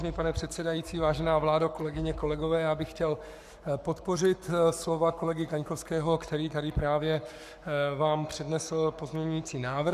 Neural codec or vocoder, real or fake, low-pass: codec, 44.1 kHz, 7.8 kbps, Pupu-Codec; fake; 14.4 kHz